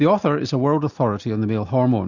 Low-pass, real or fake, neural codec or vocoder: 7.2 kHz; real; none